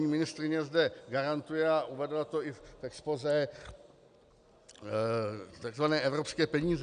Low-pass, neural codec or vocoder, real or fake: 9.9 kHz; none; real